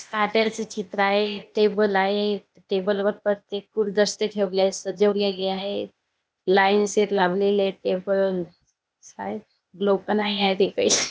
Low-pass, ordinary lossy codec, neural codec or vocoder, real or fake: none; none; codec, 16 kHz, 0.8 kbps, ZipCodec; fake